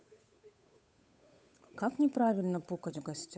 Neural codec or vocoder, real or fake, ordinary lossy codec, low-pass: codec, 16 kHz, 8 kbps, FunCodec, trained on Chinese and English, 25 frames a second; fake; none; none